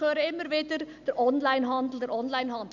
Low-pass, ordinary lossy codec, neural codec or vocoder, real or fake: 7.2 kHz; none; none; real